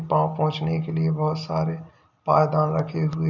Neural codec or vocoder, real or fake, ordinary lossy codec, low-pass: none; real; none; 7.2 kHz